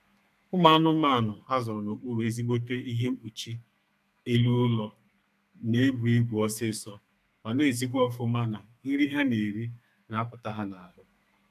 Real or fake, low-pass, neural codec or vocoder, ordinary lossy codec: fake; 14.4 kHz; codec, 32 kHz, 1.9 kbps, SNAC; none